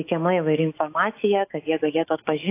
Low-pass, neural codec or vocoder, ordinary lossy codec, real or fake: 3.6 kHz; autoencoder, 48 kHz, 128 numbers a frame, DAC-VAE, trained on Japanese speech; AAC, 24 kbps; fake